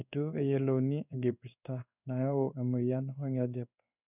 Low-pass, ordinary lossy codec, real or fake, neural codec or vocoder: 3.6 kHz; none; fake; codec, 16 kHz in and 24 kHz out, 1 kbps, XY-Tokenizer